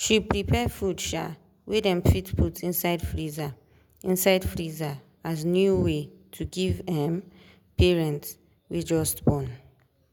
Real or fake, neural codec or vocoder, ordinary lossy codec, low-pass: real; none; none; none